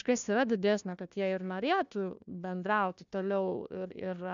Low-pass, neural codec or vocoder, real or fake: 7.2 kHz; codec, 16 kHz, 1 kbps, FunCodec, trained on Chinese and English, 50 frames a second; fake